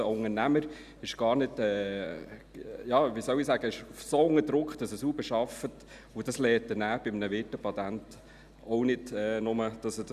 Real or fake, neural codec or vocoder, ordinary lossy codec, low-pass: real; none; none; 14.4 kHz